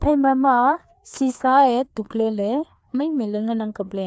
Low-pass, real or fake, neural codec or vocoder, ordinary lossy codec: none; fake; codec, 16 kHz, 2 kbps, FreqCodec, larger model; none